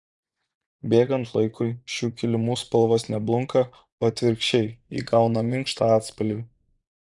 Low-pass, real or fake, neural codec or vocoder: 10.8 kHz; fake; vocoder, 24 kHz, 100 mel bands, Vocos